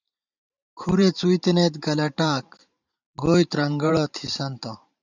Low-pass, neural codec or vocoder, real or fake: 7.2 kHz; vocoder, 44.1 kHz, 128 mel bands every 512 samples, BigVGAN v2; fake